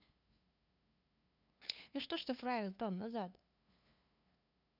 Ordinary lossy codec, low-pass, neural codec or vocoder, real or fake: none; 5.4 kHz; codec, 16 kHz, 2 kbps, FunCodec, trained on LibriTTS, 25 frames a second; fake